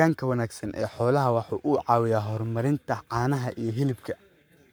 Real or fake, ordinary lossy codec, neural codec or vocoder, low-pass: fake; none; codec, 44.1 kHz, 7.8 kbps, Pupu-Codec; none